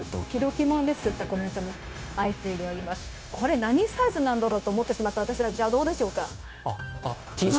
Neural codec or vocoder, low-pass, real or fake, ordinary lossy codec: codec, 16 kHz, 0.9 kbps, LongCat-Audio-Codec; none; fake; none